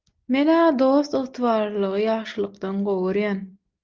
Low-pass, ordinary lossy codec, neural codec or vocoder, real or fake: 7.2 kHz; Opus, 32 kbps; none; real